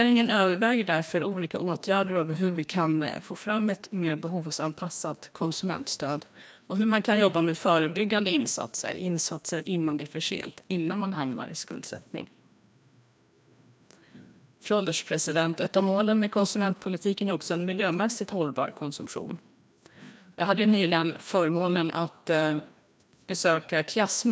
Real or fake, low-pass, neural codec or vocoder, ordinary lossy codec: fake; none; codec, 16 kHz, 1 kbps, FreqCodec, larger model; none